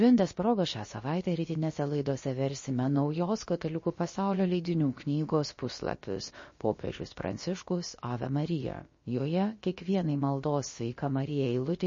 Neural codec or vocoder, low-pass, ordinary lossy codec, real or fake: codec, 16 kHz, about 1 kbps, DyCAST, with the encoder's durations; 7.2 kHz; MP3, 32 kbps; fake